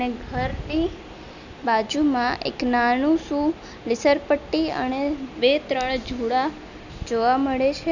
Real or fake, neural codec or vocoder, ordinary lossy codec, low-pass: real; none; none; 7.2 kHz